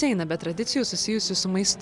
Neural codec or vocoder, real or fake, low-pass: none; real; 10.8 kHz